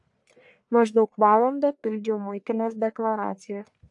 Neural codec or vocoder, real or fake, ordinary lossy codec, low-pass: codec, 44.1 kHz, 1.7 kbps, Pupu-Codec; fake; AAC, 64 kbps; 10.8 kHz